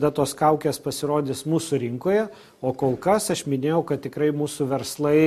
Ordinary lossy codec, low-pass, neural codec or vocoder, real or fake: MP3, 64 kbps; 14.4 kHz; none; real